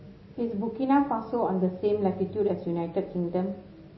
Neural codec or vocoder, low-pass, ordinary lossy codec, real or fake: none; 7.2 kHz; MP3, 24 kbps; real